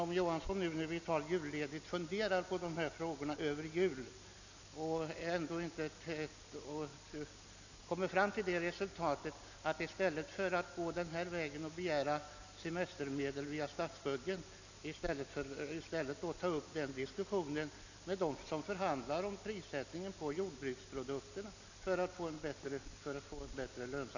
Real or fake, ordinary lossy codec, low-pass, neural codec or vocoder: real; none; 7.2 kHz; none